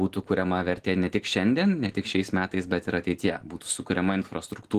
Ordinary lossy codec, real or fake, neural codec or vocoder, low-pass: Opus, 16 kbps; real; none; 14.4 kHz